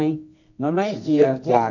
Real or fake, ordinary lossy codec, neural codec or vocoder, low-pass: fake; none; codec, 24 kHz, 0.9 kbps, WavTokenizer, medium music audio release; 7.2 kHz